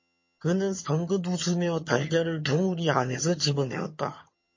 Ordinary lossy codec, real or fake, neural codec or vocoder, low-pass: MP3, 32 kbps; fake; vocoder, 22.05 kHz, 80 mel bands, HiFi-GAN; 7.2 kHz